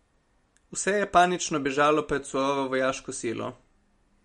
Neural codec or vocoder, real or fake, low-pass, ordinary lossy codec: none; real; 19.8 kHz; MP3, 48 kbps